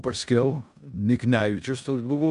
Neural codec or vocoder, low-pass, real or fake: codec, 16 kHz in and 24 kHz out, 0.9 kbps, LongCat-Audio-Codec, four codebook decoder; 10.8 kHz; fake